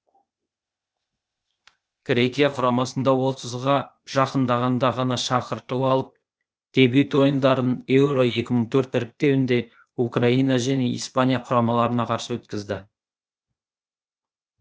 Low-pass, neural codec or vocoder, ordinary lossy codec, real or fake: none; codec, 16 kHz, 0.8 kbps, ZipCodec; none; fake